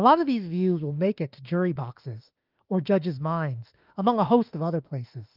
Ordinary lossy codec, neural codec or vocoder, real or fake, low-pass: Opus, 32 kbps; autoencoder, 48 kHz, 32 numbers a frame, DAC-VAE, trained on Japanese speech; fake; 5.4 kHz